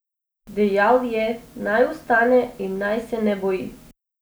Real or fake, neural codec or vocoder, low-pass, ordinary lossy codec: real; none; none; none